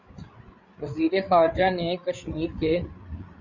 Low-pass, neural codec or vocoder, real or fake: 7.2 kHz; codec, 16 kHz, 8 kbps, FreqCodec, larger model; fake